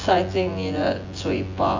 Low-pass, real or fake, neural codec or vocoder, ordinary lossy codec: 7.2 kHz; fake; vocoder, 24 kHz, 100 mel bands, Vocos; AAC, 48 kbps